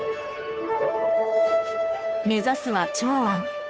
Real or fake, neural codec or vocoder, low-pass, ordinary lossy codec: fake; codec, 16 kHz, 2 kbps, FunCodec, trained on Chinese and English, 25 frames a second; none; none